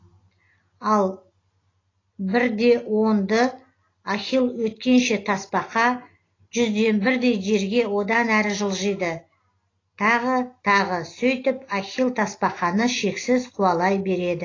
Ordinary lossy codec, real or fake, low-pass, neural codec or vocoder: AAC, 32 kbps; real; 7.2 kHz; none